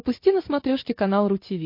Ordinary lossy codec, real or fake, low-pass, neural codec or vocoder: MP3, 32 kbps; real; 5.4 kHz; none